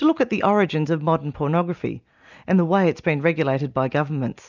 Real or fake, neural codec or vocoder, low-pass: real; none; 7.2 kHz